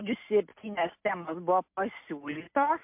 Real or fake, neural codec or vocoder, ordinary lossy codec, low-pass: real; none; MP3, 32 kbps; 3.6 kHz